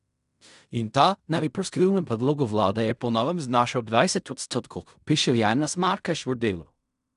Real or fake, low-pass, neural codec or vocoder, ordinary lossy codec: fake; 10.8 kHz; codec, 16 kHz in and 24 kHz out, 0.4 kbps, LongCat-Audio-Codec, fine tuned four codebook decoder; none